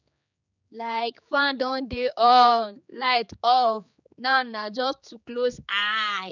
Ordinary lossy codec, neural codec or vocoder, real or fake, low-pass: none; codec, 16 kHz, 4 kbps, X-Codec, HuBERT features, trained on general audio; fake; 7.2 kHz